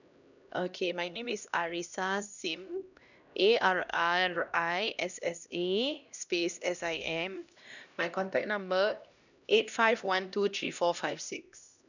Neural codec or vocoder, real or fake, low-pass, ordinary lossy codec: codec, 16 kHz, 1 kbps, X-Codec, HuBERT features, trained on LibriSpeech; fake; 7.2 kHz; none